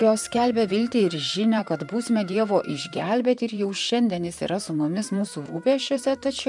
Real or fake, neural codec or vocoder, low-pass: fake; vocoder, 44.1 kHz, 128 mel bands, Pupu-Vocoder; 10.8 kHz